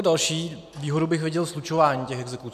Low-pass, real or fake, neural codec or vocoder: 14.4 kHz; real; none